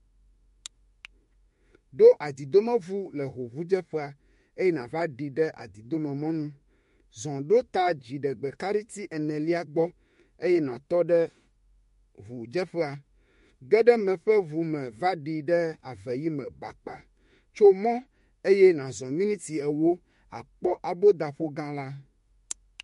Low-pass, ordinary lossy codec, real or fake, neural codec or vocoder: 14.4 kHz; MP3, 48 kbps; fake; autoencoder, 48 kHz, 32 numbers a frame, DAC-VAE, trained on Japanese speech